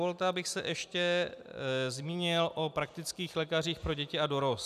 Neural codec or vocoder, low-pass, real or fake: none; 14.4 kHz; real